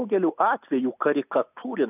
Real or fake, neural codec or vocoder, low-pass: real; none; 3.6 kHz